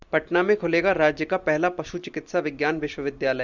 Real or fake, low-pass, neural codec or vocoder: real; 7.2 kHz; none